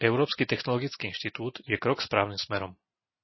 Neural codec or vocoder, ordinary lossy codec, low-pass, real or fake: none; MP3, 24 kbps; 7.2 kHz; real